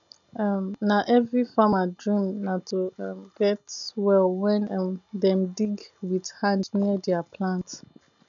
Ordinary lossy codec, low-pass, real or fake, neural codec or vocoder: none; 7.2 kHz; real; none